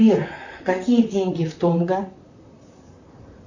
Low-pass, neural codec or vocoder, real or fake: 7.2 kHz; vocoder, 44.1 kHz, 128 mel bands, Pupu-Vocoder; fake